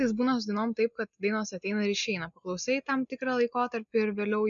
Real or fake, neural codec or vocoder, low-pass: real; none; 7.2 kHz